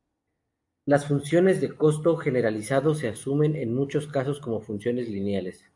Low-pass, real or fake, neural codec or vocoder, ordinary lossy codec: 10.8 kHz; real; none; AAC, 64 kbps